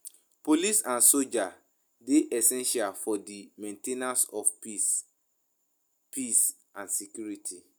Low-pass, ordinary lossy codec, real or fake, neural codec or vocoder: none; none; real; none